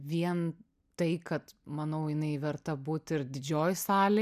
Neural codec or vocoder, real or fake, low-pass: none; real; 14.4 kHz